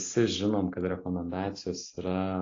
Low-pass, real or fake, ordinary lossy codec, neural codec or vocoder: 7.2 kHz; real; AAC, 32 kbps; none